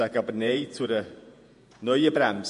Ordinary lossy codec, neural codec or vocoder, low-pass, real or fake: MP3, 48 kbps; vocoder, 48 kHz, 128 mel bands, Vocos; 14.4 kHz; fake